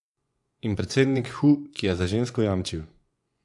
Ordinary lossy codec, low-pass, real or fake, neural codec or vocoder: AAC, 64 kbps; 10.8 kHz; fake; vocoder, 24 kHz, 100 mel bands, Vocos